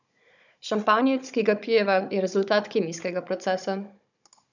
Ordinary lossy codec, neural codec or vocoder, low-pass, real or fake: none; codec, 16 kHz, 16 kbps, FunCodec, trained on Chinese and English, 50 frames a second; 7.2 kHz; fake